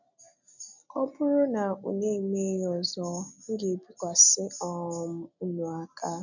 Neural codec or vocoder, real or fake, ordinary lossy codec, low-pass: none; real; none; 7.2 kHz